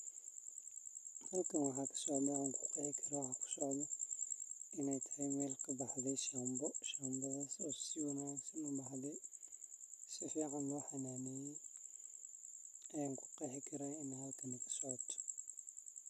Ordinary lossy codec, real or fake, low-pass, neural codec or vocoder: none; real; 14.4 kHz; none